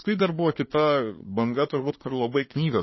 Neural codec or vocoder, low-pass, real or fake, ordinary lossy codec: codec, 24 kHz, 1 kbps, SNAC; 7.2 kHz; fake; MP3, 24 kbps